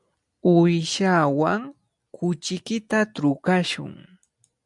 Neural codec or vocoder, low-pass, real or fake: none; 10.8 kHz; real